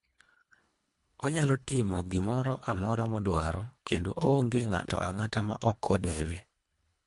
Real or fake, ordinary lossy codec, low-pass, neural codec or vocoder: fake; MP3, 64 kbps; 10.8 kHz; codec, 24 kHz, 1.5 kbps, HILCodec